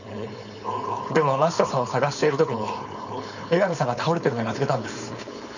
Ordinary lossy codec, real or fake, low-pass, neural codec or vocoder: none; fake; 7.2 kHz; codec, 16 kHz, 4.8 kbps, FACodec